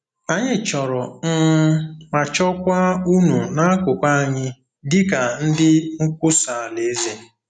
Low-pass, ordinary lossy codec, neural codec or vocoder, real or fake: 9.9 kHz; none; none; real